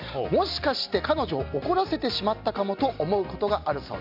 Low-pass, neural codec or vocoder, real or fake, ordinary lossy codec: 5.4 kHz; none; real; none